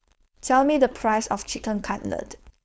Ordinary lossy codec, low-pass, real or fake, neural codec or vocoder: none; none; fake; codec, 16 kHz, 4.8 kbps, FACodec